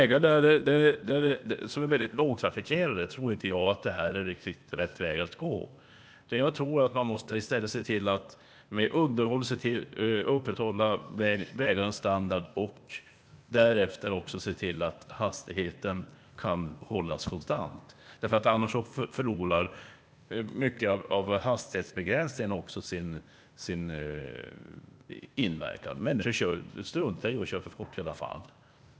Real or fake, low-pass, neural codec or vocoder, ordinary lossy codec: fake; none; codec, 16 kHz, 0.8 kbps, ZipCodec; none